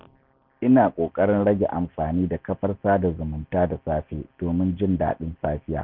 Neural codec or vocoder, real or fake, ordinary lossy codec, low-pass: none; real; none; 5.4 kHz